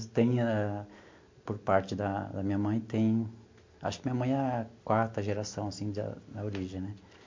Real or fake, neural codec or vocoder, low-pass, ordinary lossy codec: real; none; 7.2 kHz; MP3, 48 kbps